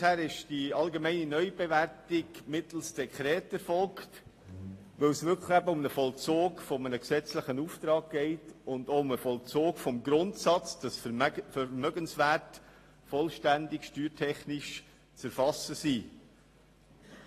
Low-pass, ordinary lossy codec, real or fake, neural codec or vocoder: 14.4 kHz; AAC, 48 kbps; real; none